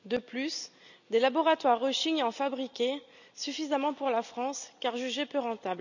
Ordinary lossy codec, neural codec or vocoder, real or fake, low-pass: none; none; real; 7.2 kHz